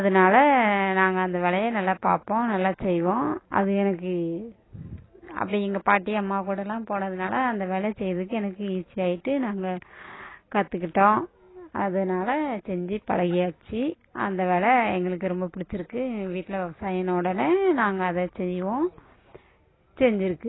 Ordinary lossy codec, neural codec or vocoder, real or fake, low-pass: AAC, 16 kbps; none; real; 7.2 kHz